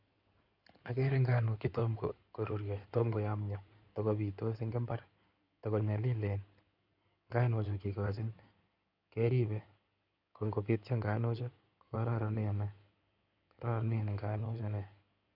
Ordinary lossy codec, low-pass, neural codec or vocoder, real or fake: none; 5.4 kHz; codec, 16 kHz in and 24 kHz out, 2.2 kbps, FireRedTTS-2 codec; fake